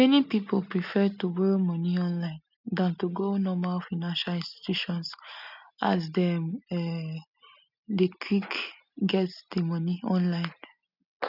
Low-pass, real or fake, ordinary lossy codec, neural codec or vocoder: 5.4 kHz; real; none; none